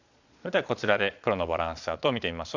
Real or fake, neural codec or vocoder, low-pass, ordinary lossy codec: fake; vocoder, 22.05 kHz, 80 mel bands, Vocos; 7.2 kHz; none